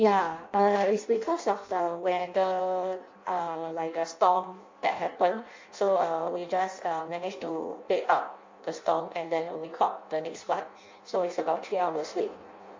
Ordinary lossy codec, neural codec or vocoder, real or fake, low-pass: MP3, 48 kbps; codec, 16 kHz in and 24 kHz out, 0.6 kbps, FireRedTTS-2 codec; fake; 7.2 kHz